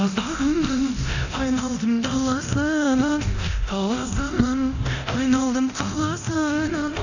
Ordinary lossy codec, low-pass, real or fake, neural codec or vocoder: none; 7.2 kHz; fake; codec, 24 kHz, 0.9 kbps, DualCodec